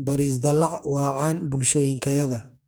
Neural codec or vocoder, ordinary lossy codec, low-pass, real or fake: codec, 44.1 kHz, 2.6 kbps, DAC; none; none; fake